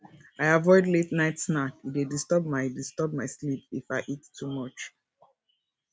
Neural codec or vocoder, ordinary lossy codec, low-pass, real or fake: none; none; none; real